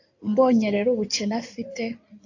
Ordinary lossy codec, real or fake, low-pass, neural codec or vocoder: AAC, 48 kbps; fake; 7.2 kHz; codec, 16 kHz in and 24 kHz out, 2.2 kbps, FireRedTTS-2 codec